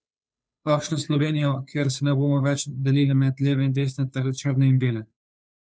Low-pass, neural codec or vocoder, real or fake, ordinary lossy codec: none; codec, 16 kHz, 2 kbps, FunCodec, trained on Chinese and English, 25 frames a second; fake; none